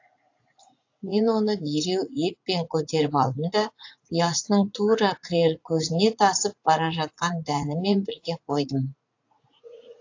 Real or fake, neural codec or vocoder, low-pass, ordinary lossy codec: fake; vocoder, 44.1 kHz, 80 mel bands, Vocos; 7.2 kHz; AAC, 48 kbps